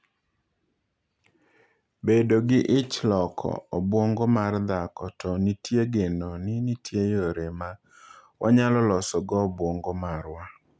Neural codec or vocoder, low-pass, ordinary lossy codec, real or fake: none; none; none; real